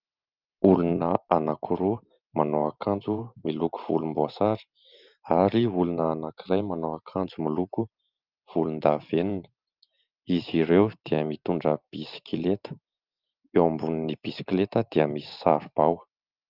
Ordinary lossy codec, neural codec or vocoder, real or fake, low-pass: Opus, 32 kbps; vocoder, 44.1 kHz, 128 mel bands every 512 samples, BigVGAN v2; fake; 5.4 kHz